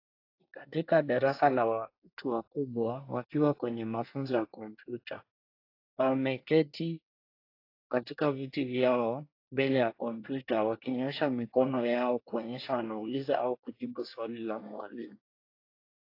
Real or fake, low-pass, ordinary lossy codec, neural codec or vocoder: fake; 5.4 kHz; AAC, 32 kbps; codec, 24 kHz, 1 kbps, SNAC